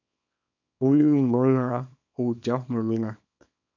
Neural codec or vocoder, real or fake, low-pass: codec, 24 kHz, 0.9 kbps, WavTokenizer, small release; fake; 7.2 kHz